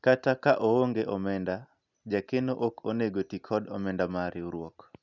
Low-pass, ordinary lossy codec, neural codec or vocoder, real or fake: 7.2 kHz; none; none; real